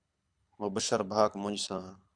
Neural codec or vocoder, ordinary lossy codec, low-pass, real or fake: codec, 24 kHz, 6 kbps, HILCodec; Opus, 64 kbps; 9.9 kHz; fake